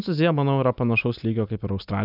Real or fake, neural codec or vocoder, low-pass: real; none; 5.4 kHz